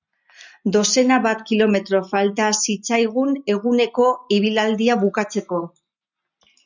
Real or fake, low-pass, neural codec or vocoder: real; 7.2 kHz; none